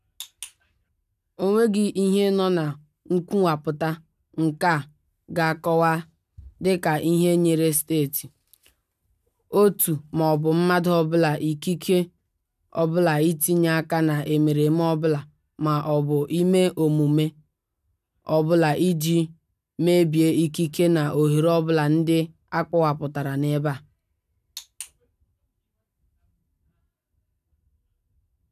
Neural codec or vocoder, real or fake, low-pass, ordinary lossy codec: none; real; 14.4 kHz; none